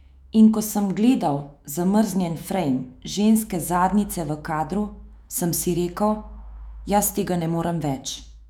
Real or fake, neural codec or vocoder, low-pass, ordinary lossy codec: fake; autoencoder, 48 kHz, 128 numbers a frame, DAC-VAE, trained on Japanese speech; 19.8 kHz; none